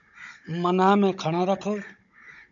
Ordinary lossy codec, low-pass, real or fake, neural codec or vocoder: MP3, 64 kbps; 7.2 kHz; fake; codec, 16 kHz, 16 kbps, FunCodec, trained on Chinese and English, 50 frames a second